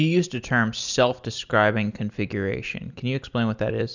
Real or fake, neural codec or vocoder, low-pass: real; none; 7.2 kHz